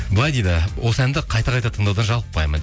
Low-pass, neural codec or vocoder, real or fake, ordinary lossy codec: none; none; real; none